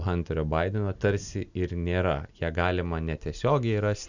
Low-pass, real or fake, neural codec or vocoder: 7.2 kHz; real; none